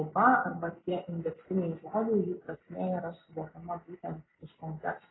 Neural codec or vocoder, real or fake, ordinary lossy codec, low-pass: none; real; AAC, 16 kbps; 7.2 kHz